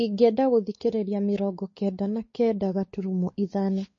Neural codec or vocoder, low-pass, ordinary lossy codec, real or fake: codec, 16 kHz, 4 kbps, X-Codec, WavLM features, trained on Multilingual LibriSpeech; 7.2 kHz; MP3, 32 kbps; fake